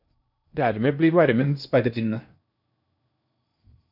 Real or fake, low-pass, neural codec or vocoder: fake; 5.4 kHz; codec, 16 kHz in and 24 kHz out, 0.8 kbps, FocalCodec, streaming, 65536 codes